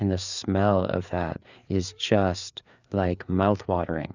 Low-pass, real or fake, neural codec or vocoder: 7.2 kHz; fake; codec, 16 kHz, 4 kbps, FreqCodec, larger model